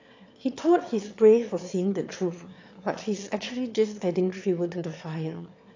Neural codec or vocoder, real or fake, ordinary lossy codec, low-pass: autoencoder, 22.05 kHz, a latent of 192 numbers a frame, VITS, trained on one speaker; fake; AAC, 48 kbps; 7.2 kHz